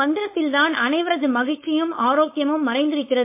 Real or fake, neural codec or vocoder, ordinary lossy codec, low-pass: fake; codec, 16 kHz, 4.8 kbps, FACodec; MP3, 24 kbps; 3.6 kHz